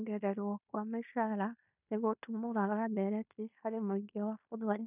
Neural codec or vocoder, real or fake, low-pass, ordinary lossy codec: codec, 16 kHz in and 24 kHz out, 0.9 kbps, LongCat-Audio-Codec, fine tuned four codebook decoder; fake; 3.6 kHz; none